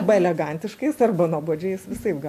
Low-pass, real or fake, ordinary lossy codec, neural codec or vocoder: 14.4 kHz; real; MP3, 64 kbps; none